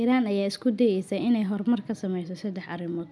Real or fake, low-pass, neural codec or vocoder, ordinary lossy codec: real; none; none; none